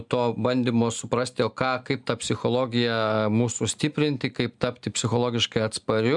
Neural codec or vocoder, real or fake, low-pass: none; real; 10.8 kHz